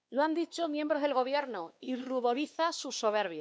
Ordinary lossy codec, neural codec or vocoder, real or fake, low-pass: none; codec, 16 kHz, 2 kbps, X-Codec, WavLM features, trained on Multilingual LibriSpeech; fake; none